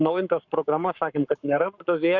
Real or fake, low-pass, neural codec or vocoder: fake; 7.2 kHz; codec, 44.1 kHz, 7.8 kbps, Pupu-Codec